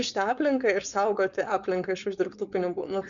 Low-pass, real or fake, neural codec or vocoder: 7.2 kHz; fake; codec, 16 kHz, 4.8 kbps, FACodec